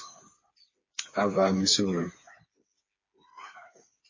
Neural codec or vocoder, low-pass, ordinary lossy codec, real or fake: codec, 16 kHz, 4 kbps, FreqCodec, smaller model; 7.2 kHz; MP3, 32 kbps; fake